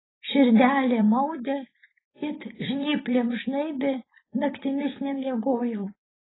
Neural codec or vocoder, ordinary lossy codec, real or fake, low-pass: vocoder, 24 kHz, 100 mel bands, Vocos; AAC, 16 kbps; fake; 7.2 kHz